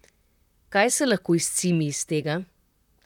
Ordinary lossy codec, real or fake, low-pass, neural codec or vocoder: none; fake; 19.8 kHz; vocoder, 44.1 kHz, 128 mel bands, Pupu-Vocoder